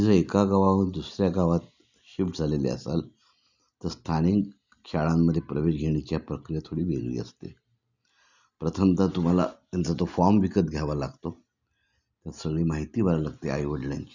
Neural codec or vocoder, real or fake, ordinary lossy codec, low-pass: none; real; none; 7.2 kHz